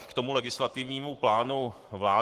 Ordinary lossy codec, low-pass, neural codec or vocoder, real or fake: Opus, 24 kbps; 14.4 kHz; codec, 44.1 kHz, 7.8 kbps, Pupu-Codec; fake